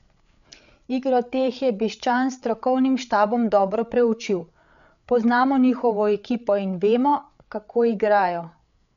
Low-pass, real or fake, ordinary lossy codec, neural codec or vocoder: 7.2 kHz; fake; none; codec, 16 kHz, 8 kbps, FreqCodec, larger model